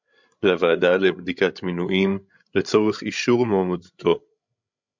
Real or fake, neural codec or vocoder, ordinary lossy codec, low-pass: fake; codec, 16 kHz, 16 kbps, FreqCodec, larger model; MP3, 64 kbps; 7.2 kHz